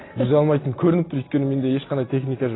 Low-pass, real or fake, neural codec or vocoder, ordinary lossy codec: 7.2 kHz; real; none; AAC, 16 kbps